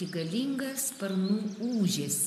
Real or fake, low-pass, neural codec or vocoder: real; 14.4 kHz; none